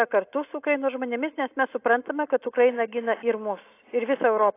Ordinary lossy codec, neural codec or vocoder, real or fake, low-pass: AAC, 24 kbps; none; real; 3.6 kHz